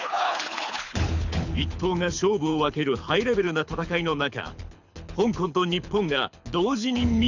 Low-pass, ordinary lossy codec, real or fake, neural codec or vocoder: 7.2 kHz; none; fake; codec, 24 kHz, 6 kbps, HILCodec